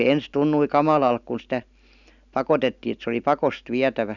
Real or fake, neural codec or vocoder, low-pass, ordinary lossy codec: real; none; 7.2 kHz; none